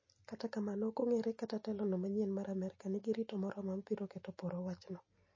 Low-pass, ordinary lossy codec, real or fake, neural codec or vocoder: 7.2 kHz; MP3, 32 kbps; real; none